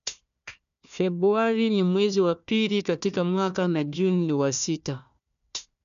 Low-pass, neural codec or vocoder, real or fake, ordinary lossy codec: 7.2 kHz; codec, 16 kHz, 1 kbps, FunCodec, trained on Chinese and English, 50 frames a second; fake; none